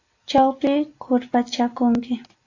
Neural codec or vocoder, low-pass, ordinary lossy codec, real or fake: none; 7.2 kHz; AAC, 48 kbps; real